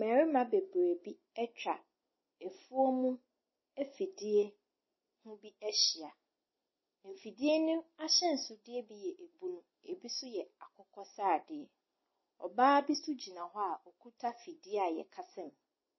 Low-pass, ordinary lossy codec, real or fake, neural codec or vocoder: 7.2 kHz; MP3, 24 kbps; real; none